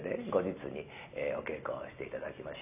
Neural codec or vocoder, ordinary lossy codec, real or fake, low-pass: none; none; real; 3.6 kHz